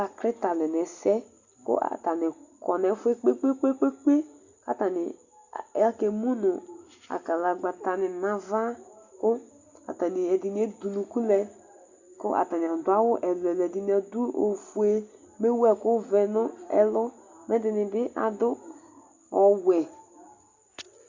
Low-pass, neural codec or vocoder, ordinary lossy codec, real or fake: 7.2 kHz; none; Opus, 64 kbps; real